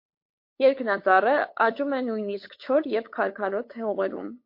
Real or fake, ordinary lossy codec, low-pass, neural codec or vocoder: fake; MP3, 32 kbps; 5.4 kHz; codec, 16 kHz, 8 kbps, FunCodec, trained on LibriTTS, 25 frames a second